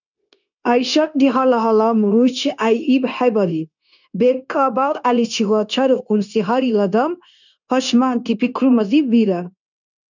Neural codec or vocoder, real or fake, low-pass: codec, 16 kHz, 0.9 kbps, LongCat-Audio-Codec; fake; 7.2 kHz